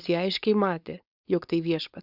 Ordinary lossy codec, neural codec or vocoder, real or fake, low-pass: Opus, 64 kbps; codec, 16 kHz, 4.8 kbps, FACodec; fake; 5.4 kHz